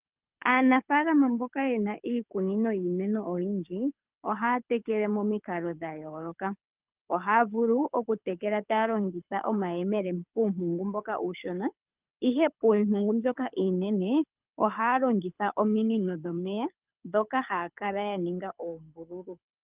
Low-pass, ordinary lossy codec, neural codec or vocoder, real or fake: 3.6 kHz; Opus, 24 kbps; codec, 24 kHz, 6 kbps, HILCodec; fake